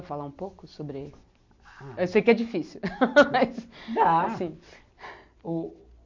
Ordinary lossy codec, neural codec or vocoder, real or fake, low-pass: MP3, 48 kbps; none; real; 7.2 kHz